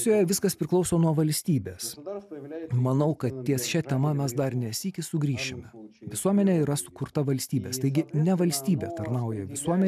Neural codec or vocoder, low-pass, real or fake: none; 14.4 kHz; real